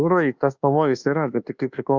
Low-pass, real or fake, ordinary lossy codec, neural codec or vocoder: 7.2 kHz; fake; Opus, 64 kbps; codec, 24 kHz, 1.2 kbps, DualCodec